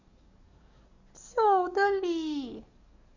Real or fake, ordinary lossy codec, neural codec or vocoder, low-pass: fake; none; codec, 44.1 kHz, 7.8 kbps, DAC; 7.2 kHz